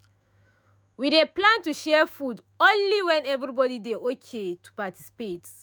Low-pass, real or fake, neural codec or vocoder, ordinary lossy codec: none; fake; autoencoder, 48 kHz, 128 numbers a frame, DAC-VAE, trained on Japanese speech; none